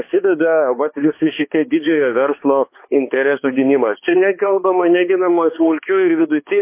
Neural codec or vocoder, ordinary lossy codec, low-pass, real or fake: codec, 16 kHz, 2 kbps, X-Codec, HuBERT features, trained on balanced general audio; MP3, 24 kbps; 3.6 kHz; fake